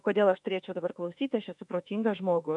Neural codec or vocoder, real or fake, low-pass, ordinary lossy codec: autoencoder, 48 kHz, 32 numbers a frame, DAC-VAE, trained on Japanese speech; fake; 10.8 kHz; MP3, 96 kbps